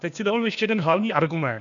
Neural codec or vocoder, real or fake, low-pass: codec, 16 kHz, 0.8 kbps, ZipCodec; fake; 7.2 kHz